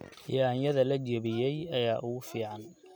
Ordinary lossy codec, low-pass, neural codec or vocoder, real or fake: none; none; none; real